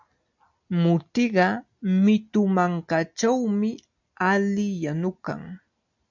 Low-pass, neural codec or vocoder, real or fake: 7.2 kHz; none; real